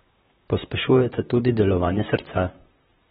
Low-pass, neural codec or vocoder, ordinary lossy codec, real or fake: 19.8 kHz; vocoder, 44.1 kHz, 128 mel bands, Pupu-Vocoder; AAC, 16 kbps; fake